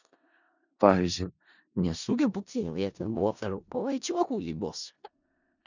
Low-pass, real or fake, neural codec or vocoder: 7.2 kHz; fake; codec, 16 kHz in and 24 kHz out, 0.4 kbps, LongCat-Audio-Codec, four codebook decoder